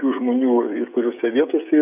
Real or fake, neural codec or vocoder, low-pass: fake; codec, 16 kHz, 8 kbps, FreqCodec, smaller model; 3.6 kHz